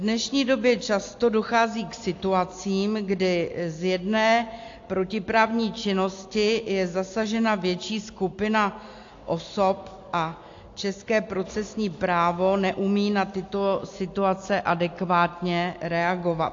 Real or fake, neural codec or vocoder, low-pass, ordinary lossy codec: real; none; 7.2 kHz; AAC, 48 kbps